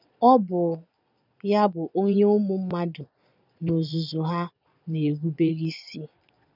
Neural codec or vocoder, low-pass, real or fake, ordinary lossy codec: vocoder, 22.05 kHz, 80 mel bands, Vocos; 5.4 kHz; fake; none